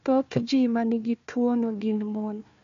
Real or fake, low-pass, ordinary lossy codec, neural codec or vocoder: fake; 7.2 kHz; MP3, 64 kbps; codec, 16 kHz, 1 kbps, FunCodec, trained on Chinese and English, 50 frames a second